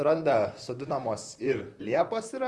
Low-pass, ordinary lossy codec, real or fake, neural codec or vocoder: 10.8 kHz; Opus, 32 kbps; fake; vocoder, 44.1 kHz, 128 mel bands, Pupu-Vocoder